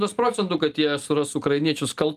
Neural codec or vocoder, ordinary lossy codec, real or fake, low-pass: none; Opus, 32 kbps; real; 14.4 kHz